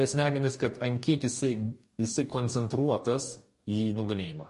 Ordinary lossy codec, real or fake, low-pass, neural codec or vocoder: MP3, 48 kbps; fake; 14.4 kHz; codec, 44.1 kHz, 2.6 kbps, DAC